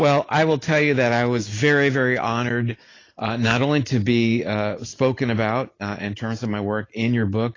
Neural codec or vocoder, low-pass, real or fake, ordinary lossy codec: none; 7.2 kHz; real; AAC, 32 kbps